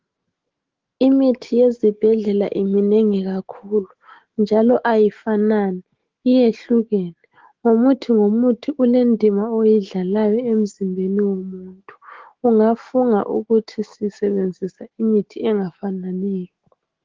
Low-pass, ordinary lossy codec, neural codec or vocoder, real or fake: 7.2 kHz; Opus, 16 kbps; autoencoder, 48 kHz, 128 numbers a frame, DAC-VAE, trained on Japanese speech; fake